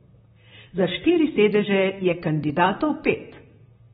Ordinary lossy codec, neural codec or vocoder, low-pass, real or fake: AAC, 16 kbps; vocoder, 44.1 kHz, 128 mel bands, Pupu-Vocoder; 19.8 kHz; fake